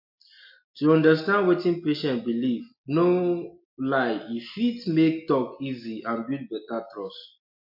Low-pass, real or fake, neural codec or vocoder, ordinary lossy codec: 5.4 kHz; real; none; MP3, 32 kbps